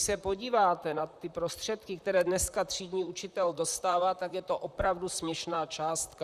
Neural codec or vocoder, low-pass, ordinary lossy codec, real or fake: vocoder, 44.1 kHz, 128 mel bands, Pupu-Vocoder; 14.4 kHz; Opus, 64 kbps; fake